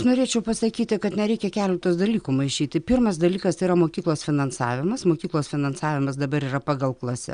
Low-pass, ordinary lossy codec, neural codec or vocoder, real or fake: 9.9 kHz; Opus, 64 kbps; none; real